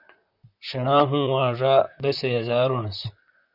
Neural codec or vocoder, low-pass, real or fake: vocoder, 44.1 kHz, 128 mel bands, Pupu-Vocoder; 5.4 kHz; fake